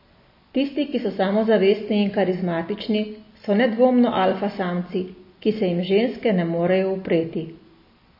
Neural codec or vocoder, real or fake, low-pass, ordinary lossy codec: none; real; 5.4 kHz; MP3, 24 kbps